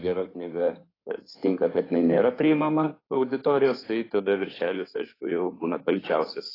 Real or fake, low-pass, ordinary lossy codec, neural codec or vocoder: fake; 5.4 kHz; AAC, 24 kbps; codec, 16 kHz in and 24 kHz out, 2.2 kbps, FireRedTTS-2 codec